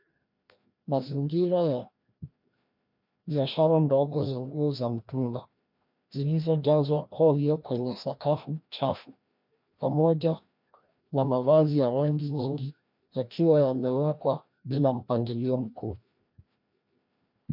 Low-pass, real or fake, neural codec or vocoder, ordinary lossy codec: 5.4 kHz; fake; codec, 16 kHz, 1 kbps, FreqCodec, larger model; MP3, 48 kbps